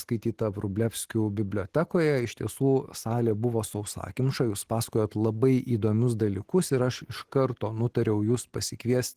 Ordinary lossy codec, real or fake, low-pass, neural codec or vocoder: Opus, 16 kbps; real; 14.4 kHz; none